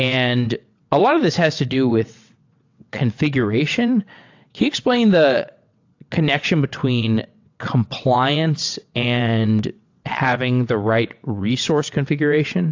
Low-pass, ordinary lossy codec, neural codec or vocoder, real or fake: 7.2 kHz; AAC, 48 kbps; vocoder, 22.05 kHz, 80 mel bands, WaveNeXt; fake